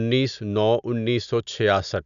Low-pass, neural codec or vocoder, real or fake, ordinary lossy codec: 7.2 kHz; none; real; none